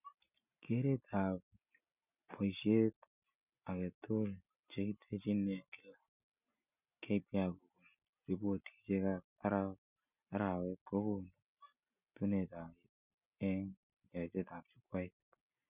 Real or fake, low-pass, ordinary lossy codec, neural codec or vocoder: real; 3.6 kHz; none; none